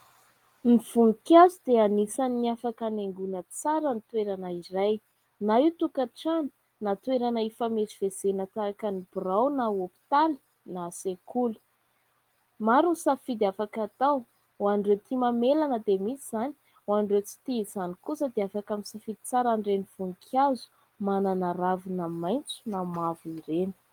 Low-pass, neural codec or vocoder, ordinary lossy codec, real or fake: 19.8 kHz; none; Opus, 24 kbps; real